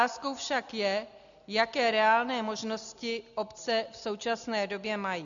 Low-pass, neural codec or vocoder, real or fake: 7.2 kHz; none; real